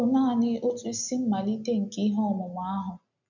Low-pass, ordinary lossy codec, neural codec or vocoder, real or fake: 7.2 kHz; none; none; real